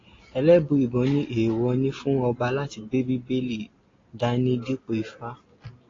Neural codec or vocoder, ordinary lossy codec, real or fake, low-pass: none; AAC, 32 kbps; real; 7.2 kHz